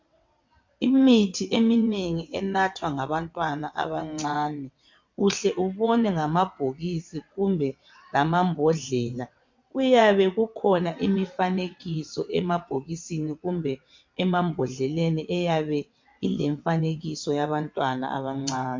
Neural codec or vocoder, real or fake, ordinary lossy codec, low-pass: vocoder, 22.05 kHz, 80 mel bands, WaveNeXt; fake; MP3, 48 kbps; 7.2 kHz